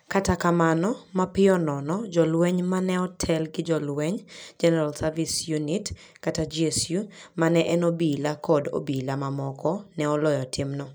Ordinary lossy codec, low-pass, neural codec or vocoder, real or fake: none; none; none; real